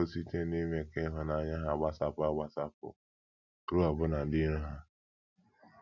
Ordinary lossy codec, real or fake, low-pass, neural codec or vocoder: none; real; 7.2 kHz; none